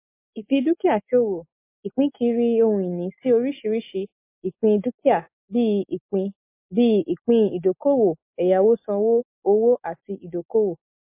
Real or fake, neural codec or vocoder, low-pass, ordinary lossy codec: real; none; 3.6 kHz; MP3, 24 kbps